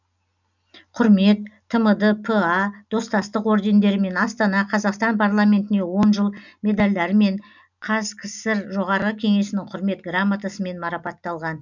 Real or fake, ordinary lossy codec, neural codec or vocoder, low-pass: real; none; none; 7.2 kHz